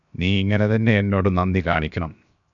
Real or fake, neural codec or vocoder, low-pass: fake; codec, 16 kHz, 0.7 kbps, FocalCodec; 7.2 kHz